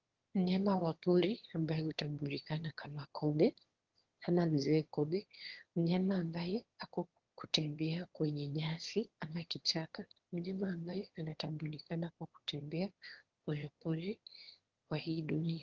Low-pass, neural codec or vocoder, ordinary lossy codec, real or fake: 7.2 kHz; autoencoder, 22.05 kHz, a latent of 192 numbers a frame, VITS, trained on one speaker; Opus, 16 kbps; fake